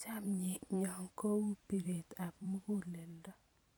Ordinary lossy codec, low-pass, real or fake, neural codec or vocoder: none; none; fake; vocoder, 44.1 kHz, 128 mel bands every 512 samples, BigVGAN v2